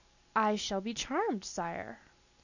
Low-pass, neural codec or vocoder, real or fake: 7.2 kHz; none; real